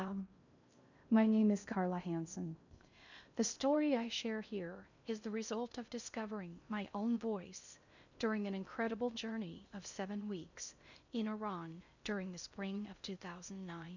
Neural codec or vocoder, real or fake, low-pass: codec, 16 kHz in and 24 kHz out, 0.6 kbps, FocalCodec, streaming, 2048 codes; fake; 7.2 kHz